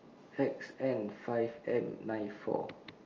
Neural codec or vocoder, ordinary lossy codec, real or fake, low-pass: none; Opus, 32 kbps; real; 7.2 kHz